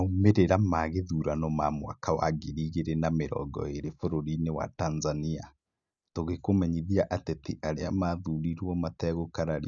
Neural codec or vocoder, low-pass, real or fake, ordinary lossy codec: none; 7.2 kHz; real; none